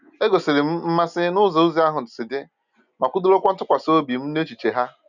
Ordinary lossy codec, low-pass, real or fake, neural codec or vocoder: none; 7.2 kHz; real; none